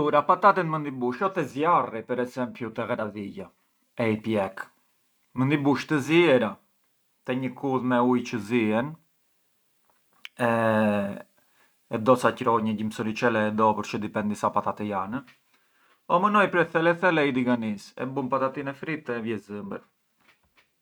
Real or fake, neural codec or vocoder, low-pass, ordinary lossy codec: real; none; none; none